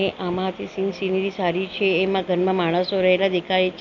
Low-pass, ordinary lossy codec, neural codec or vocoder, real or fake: 7.2 kHz; none; none; real